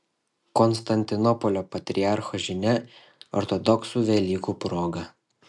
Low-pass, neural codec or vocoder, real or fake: 10.8 kHz; none; real